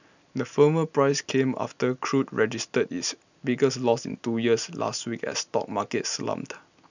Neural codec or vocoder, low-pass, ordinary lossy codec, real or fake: none; 7.2 kHz; none; real